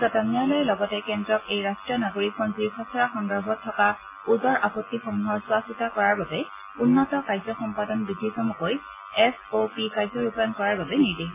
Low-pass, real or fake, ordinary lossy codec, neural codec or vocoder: 3.6 kHz; real; MP3, 16 kbps; none